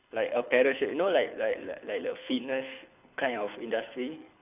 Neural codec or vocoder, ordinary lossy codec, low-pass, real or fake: codec, 24 kHz, 6 kbps, HILCodec; none; 3.6 kHz; fake